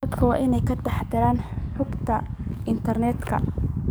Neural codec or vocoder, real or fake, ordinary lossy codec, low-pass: codec, 44.1 kHz, 7.8 kbps, DAC; fake; none; none